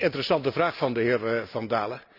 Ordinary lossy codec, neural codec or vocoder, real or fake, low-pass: none; none; real; 5.4 kHz